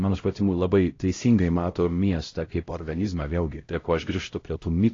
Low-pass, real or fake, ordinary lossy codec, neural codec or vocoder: 7.2 kHz; fake; AAC, 32 kbps; codec, 16 kHz, 0.5 kbps, X-Codec, HuBERT features, trained on LibriSpeech